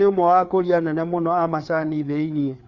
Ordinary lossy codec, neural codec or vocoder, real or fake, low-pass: Opus, 64 kbps; codec, 16 kHz, 4 kbps, FreqCodec, larger model; fake; 7.2 kHz